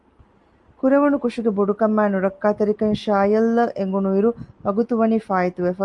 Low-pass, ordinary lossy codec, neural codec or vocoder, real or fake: 10.8 kHz; Opus, 32 kbps; none; real